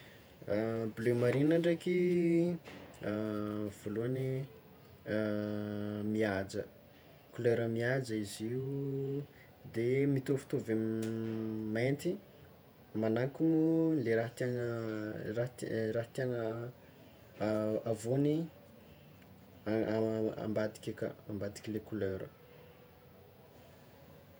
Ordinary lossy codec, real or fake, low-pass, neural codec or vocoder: none; fake; none; vocoder, 48 kHz, 128 mel bands, Vocos